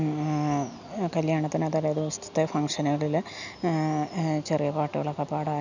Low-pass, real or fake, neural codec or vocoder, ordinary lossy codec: 7.2 kHz; real; none; none